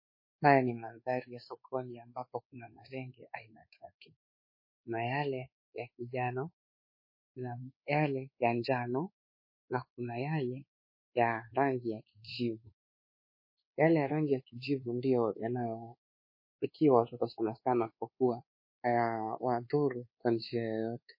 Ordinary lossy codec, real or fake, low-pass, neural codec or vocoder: MP3, 24 kbps; fake; 5.4 kHz; codec, 24 kHz, 1.2 kbps, DualCodec